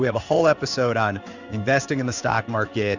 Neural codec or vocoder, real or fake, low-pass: codec, 16 kHz in and 24 kHz out, 1 kbps, XY-Tokenizer; fake; 7.2 kHz